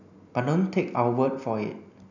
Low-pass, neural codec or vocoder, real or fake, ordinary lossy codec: 7.2 kHz; none; real; none